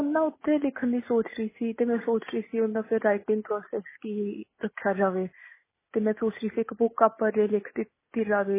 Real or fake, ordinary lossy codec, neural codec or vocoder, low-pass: real; MP3, 16 kbps; none; 3.6 kHz